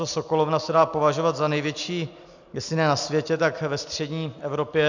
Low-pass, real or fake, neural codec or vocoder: 7.2 kHz; real; none